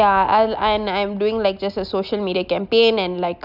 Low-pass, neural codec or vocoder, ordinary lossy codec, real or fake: 5.4 kHz; none; none; real